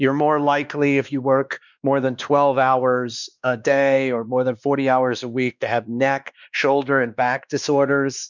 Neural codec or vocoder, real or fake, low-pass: codec, 16 kHz, 2 kbps, X-Codec, WavLM features, trained on Multilingual LibriSpeech; fake; 7.2 kHz